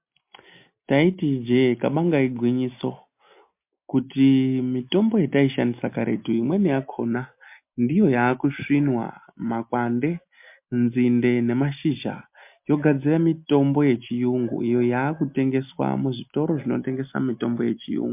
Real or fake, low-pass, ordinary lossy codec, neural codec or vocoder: real; 3.6 kHz; MP3, 32 kbps; none